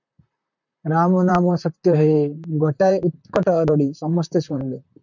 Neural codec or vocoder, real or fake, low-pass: vocoder, 44.1 kHz, 128 mel bands, Pupu-Vocoder; fake; 7.2 kHz